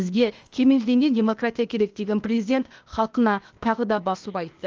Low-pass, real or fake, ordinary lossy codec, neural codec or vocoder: 7.2 kHz; fake; Opus, 24 kbps; codec, 16 kHz, 0.8 kbps, ZipCodec